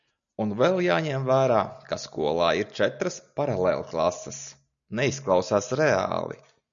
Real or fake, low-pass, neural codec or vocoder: real; 7.2 kHz; none